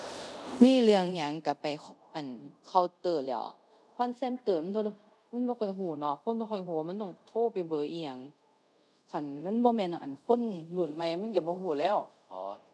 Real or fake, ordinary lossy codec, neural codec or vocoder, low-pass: fake; none; codec, 24 kHz, 0.5 kbps, DualCodec; none